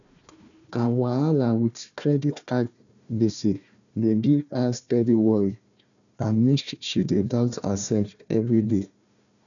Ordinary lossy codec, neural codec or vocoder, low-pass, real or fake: none; codec, 16 kHz, 1 kbps, FunCodec, trained on Chinese and English, 50 frames a second; 7.2 kHz; fake